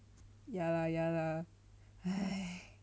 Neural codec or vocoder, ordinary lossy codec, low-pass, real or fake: none; none; none; real